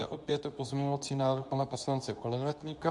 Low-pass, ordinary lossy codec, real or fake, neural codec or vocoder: 10.8 kHz; AAC, 64 kbps; fake; codec, 24 kHz, 0.9 kbps, WavTokenizer, medium speech release version 2